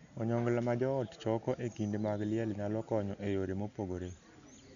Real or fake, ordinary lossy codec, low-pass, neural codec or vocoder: real; none; 7.2 kHz; none